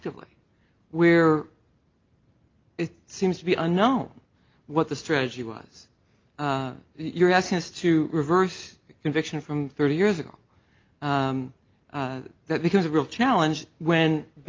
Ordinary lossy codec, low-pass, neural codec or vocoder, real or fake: Opus, 32 kbps; 7.2 kHz; none; real